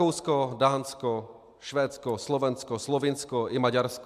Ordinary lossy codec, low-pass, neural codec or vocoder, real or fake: MP3, 96 kbps; 14.4 kHz; none; real